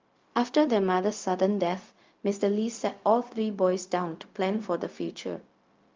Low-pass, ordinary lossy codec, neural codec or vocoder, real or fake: 7.2 kHz; Opus, 32 kbps; codec, 16 kHz, 0.4 kbps, LongCat-Audio-Codec; fake